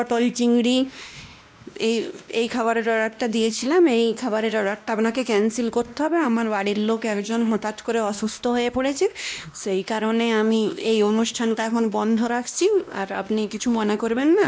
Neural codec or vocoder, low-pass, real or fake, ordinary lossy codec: codec, 16 kHz, 1 kbps, X-Codec, WavLM features, trained on Multilingual LibriSpeech; none; fake; none